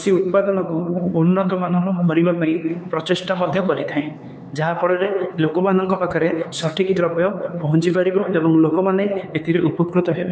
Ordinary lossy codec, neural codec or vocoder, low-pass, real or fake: none; codec, 16 kHz, 4 kbps, X-Codec, HuBERT features, trained on LibriSpeech; none; fake